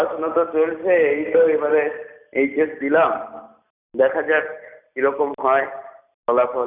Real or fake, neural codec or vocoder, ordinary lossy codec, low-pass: real; none; none; 3.6 kHz